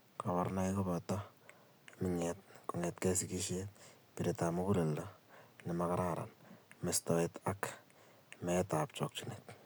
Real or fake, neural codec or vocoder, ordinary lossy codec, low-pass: real; none; none; none